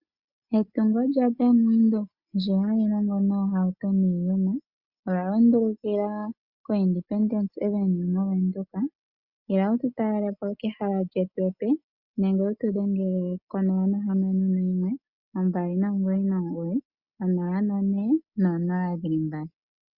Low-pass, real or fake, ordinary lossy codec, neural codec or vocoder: 5.4 kHz; real; Opus, 64 kbps; none